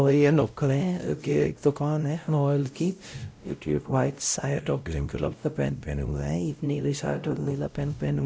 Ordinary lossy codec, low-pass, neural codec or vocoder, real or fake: none; none; codec, 16 kHz, 0.5 kbps, X-Codec, WavLM features, trained on Multilingual LibriSpeech; fake